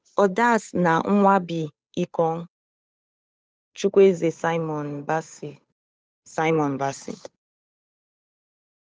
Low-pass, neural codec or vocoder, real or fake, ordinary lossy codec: none; codec, 16 kHz, 8 kbps, FunCodec, trained on Chinese and English, 25 frames a second; fake; none